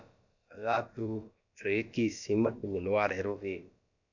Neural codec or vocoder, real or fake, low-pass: codec, 16 kHz, about 1 kbps, DyCAST, with the encoder's durations; fake; 7.2 kHz